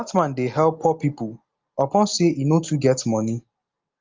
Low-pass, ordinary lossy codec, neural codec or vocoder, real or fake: 7.2 kHz; Opus, 24 kbps; none; real